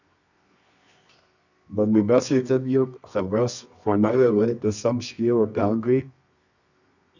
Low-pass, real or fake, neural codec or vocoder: 7.2 kHz; fake; codec, 24 kHz, 0.9 kbps, WavTokenizer, medium music audio release